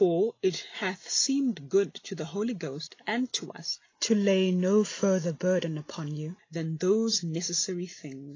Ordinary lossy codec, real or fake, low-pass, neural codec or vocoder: AAC, 32 kbps; real; 7.2 kHz; none